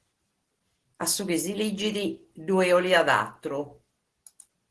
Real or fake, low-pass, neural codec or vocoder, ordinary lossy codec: real; 10.8 kHz; none; Opus, 16 kbps